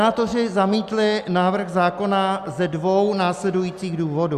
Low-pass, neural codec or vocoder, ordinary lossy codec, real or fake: 14.4 kHz; none; Opus, 64 kbps; real